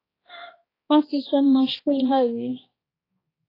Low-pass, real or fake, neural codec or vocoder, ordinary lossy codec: 5.4 kHz; fake; codec, 16 kHz, 1 kbps, X-Codec, HuBERT features, trained on balanced general audio; AAC, 24 kbps